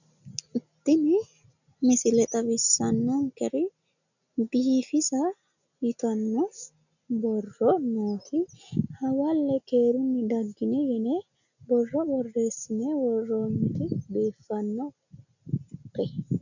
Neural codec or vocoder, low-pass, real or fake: none; 7.2 kHz; real